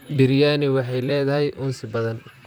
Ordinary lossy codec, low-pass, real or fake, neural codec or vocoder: none; none; fake; vocoder, 44.1 kHz, 128 mel bands, Pupu-Vocoder